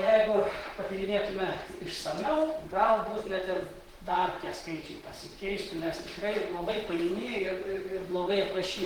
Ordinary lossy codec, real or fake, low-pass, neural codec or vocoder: Opus, 16 kbps; fake; 19.8 kHz; vocoder, 44.1 kHz, 128 mel bands, Pupu-Vocoder